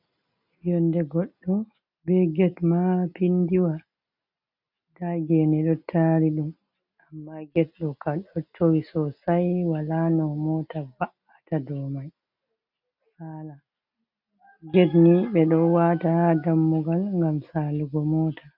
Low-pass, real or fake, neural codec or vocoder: 5.4 kHz; real; none